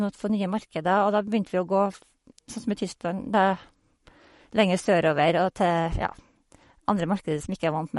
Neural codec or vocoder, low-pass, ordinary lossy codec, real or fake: codec, 44.1 kHz, 7.8 kbps, Pupu-Codec; 19.8 kHz; MP3, 48 kbps; fake